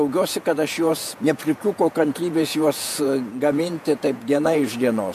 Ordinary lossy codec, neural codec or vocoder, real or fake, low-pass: MP3, 64 kbps; vocoder, 48 kHz, 128 mel bands, Vocos; fake; 14.4 kHz